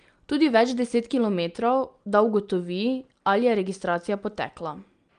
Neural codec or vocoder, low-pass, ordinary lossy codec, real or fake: none; 9.9 kHz; Opus, 32 kbps; real